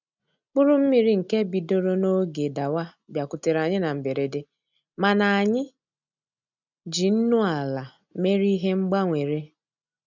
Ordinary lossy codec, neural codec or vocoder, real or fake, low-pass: none; none; real; 7.2 kHz